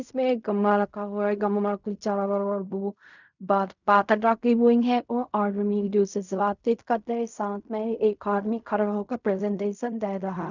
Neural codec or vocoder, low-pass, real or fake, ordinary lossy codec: codec, 16 kHz in and 24 kHz out, 0.4 kbps, LongCat-Audio-Codec, fine tuned four codebook decoder; 7.2 kHz; fake; none